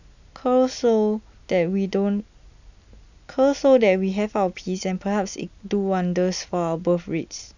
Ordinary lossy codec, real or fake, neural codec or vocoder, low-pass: none; real; none; 7.2 kHz